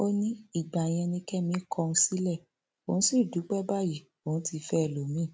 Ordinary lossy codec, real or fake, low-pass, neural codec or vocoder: none; real; none; none